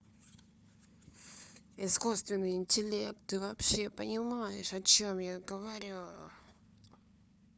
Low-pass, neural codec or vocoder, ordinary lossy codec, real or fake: none; codec, 16 kHz, 4 kbps, FunCodec, trained on Chinese and English, 50 frames a second; none; fake